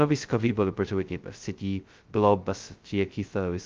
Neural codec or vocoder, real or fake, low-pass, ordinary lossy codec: codec, 16 kHz, 0.2 kbps, FocalCodec; fake; 7.2 kHz; Opus, 24 kbps